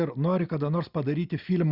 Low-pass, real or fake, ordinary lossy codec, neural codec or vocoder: 5.4 kHz; real; Opus, 64 kbps; none